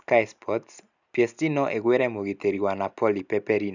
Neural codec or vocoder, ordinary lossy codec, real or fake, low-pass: none; none; real; 7.2 kHz